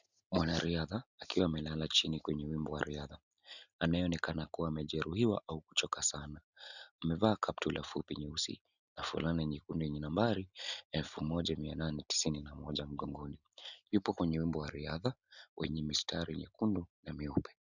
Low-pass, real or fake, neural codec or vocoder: 7.2 kHz; real; none